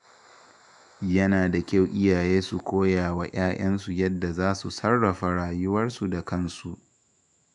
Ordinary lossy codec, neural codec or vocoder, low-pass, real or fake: none; autoencoder, 48 kHz, 128 numbers a frame, DAC-VAE, trained on Japanese speech; 10.8 kHz; fake